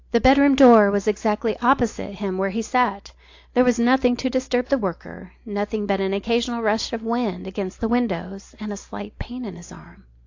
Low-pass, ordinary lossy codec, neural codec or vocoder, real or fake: 7.2 kHz; AAC, 48 kbps; none; real